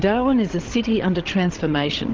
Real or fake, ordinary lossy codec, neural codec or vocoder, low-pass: fake; Opus, 16 kbps; codec, 16 kHz, 16 kbps, FreqCodec, larger model; 7.2 kHz